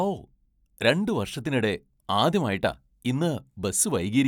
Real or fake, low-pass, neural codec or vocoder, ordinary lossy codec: real; 19.8 kHz; none; none